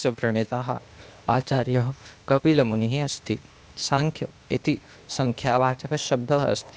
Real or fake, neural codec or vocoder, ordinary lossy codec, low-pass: fake; codec, 16 kHz, 0.8 kbps, ZipCodec; none; none